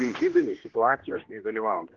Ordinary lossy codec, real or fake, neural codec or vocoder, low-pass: Opus, 16 kbps; fake; codec, 16 kHz, 1 kbps, X-Codec, HuBERT features, trained on general audio; 7.2 kHz